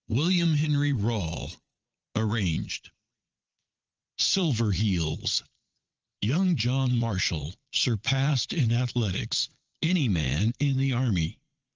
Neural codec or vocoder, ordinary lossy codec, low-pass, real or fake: vocoder, 22.05 kHz, 80 mel bands, Vocos; Opus, 24 kbps; 7.2 kHz; fake